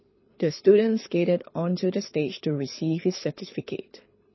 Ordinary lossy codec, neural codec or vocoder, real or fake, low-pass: MP3, 24 kbps; codec, 24 kHz, 6 kbps, HILCodec; fake; 7.2 kHz